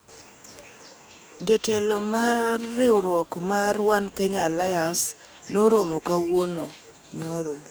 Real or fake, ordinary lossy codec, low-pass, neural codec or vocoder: fake; none; none; codec, 44.1 kHz, 2.6 kbps, DAC